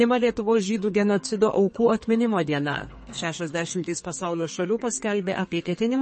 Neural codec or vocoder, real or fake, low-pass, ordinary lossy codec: codec, 32 kHz, 1.9 kbps, SNAC; fake; 10.8 kHz; MP3, 32 kbps